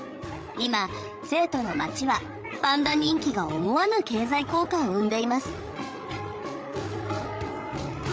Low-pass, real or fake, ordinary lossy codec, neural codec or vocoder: none; fake; none; codec, 16 kHz, 8 kbps, FreqCodec, larger model